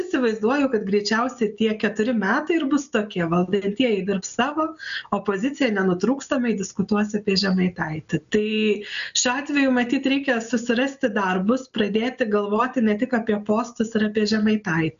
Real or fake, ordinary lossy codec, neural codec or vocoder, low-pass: real; AAC, 96 kbps; none; 7.2 kHz